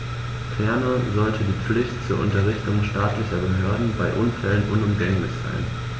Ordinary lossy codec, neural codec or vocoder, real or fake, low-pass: none; none; real; none